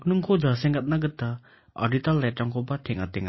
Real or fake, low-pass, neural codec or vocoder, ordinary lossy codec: real; 7.2 kHz; none; MP3, 24 kbps